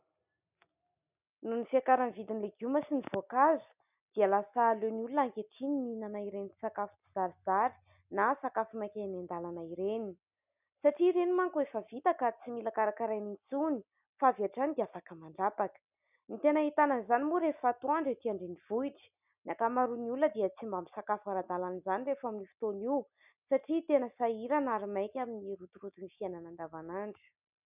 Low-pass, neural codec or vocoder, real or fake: 3.6 kHz; none; real